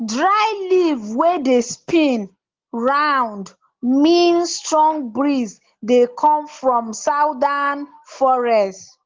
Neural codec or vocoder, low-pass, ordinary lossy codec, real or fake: none; 7.2 kHz; Opus, 16 kbps; real